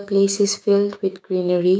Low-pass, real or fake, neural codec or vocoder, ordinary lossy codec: none; fake; codec, 16 kHz, 6 kbps, DAC; none